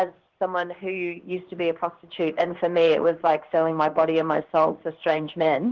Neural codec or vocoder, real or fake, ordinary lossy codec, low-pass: codec, 16 kHz in and 24 kHz out, 1 kbps, XY-Tokenizer; fake; Opus, 16 kbps; 7.2 kHz